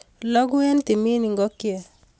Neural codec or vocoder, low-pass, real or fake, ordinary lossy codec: none; none; real; none